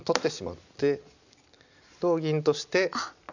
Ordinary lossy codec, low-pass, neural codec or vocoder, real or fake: none; 7.2 kHz; none; real